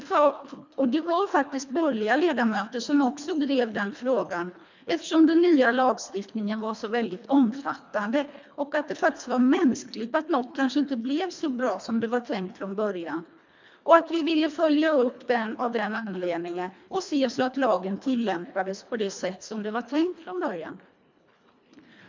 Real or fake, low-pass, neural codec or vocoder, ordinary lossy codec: fake; 7.2 kHz; codec, 24 kHz, 1.5 kbps, HILCodec; MP3, 64 kbps